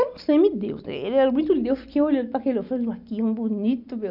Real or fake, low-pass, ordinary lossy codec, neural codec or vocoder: real; 5.4 kHz; none; none